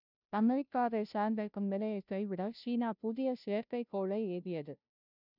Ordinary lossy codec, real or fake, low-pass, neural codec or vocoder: none; fake; 5.4 kHz; codec, 16 kHz, 0.5 kbps, FunCodec, trained on Chinese and English, 25 frames a second